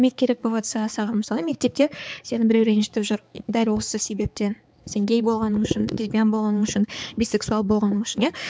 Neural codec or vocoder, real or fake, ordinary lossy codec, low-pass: codec, 16 kHz, 4 kbps, X-Codec, HuBERT features, trained on balanced general audio; fake; none; none